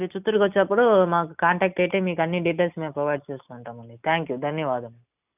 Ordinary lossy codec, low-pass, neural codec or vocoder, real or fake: none; 3.6 kHz; none; real